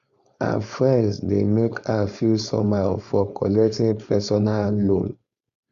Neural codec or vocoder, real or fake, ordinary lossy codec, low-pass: codec, 16 kHz, 4.8 kbps, FACodec; fake; Opus, 64 kbps; 7.2 kHz